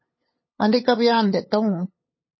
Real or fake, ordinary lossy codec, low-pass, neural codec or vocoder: fake; MP3, 24 kbps; 7.2 kHz; codec, 16 kHz, 8 kbps, FunCodec, trained on LibriTTS, 25 frames a second